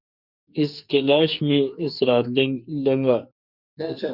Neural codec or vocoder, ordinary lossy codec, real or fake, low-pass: codec, 44.1 kHz, 2.6 kbps, SNAC; Opus, 64 kbps; fake; 5.4 kHz